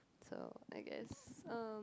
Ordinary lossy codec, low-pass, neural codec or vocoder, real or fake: none; none; none; real